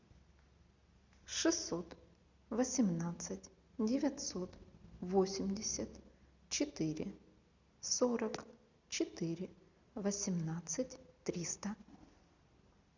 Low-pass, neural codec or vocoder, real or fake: 7.2 kHz; none; real